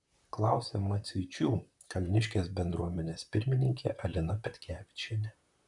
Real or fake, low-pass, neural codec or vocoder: fake; 10.8 kHz; vocoder, 44.1 kHz, 128 mel bands, Pupu-Vocoder